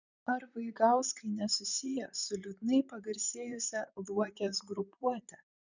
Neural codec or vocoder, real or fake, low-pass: vocoder, 44.1 kHz, 128 mel bands, Pupu-Vocoder; fake; 7.2 kHz